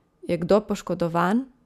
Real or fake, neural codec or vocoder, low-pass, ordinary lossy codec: real; none; 14.4 kHz; none